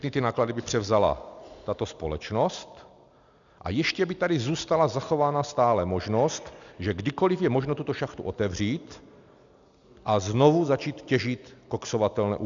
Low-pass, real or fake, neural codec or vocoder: 7.2 kHz; real; none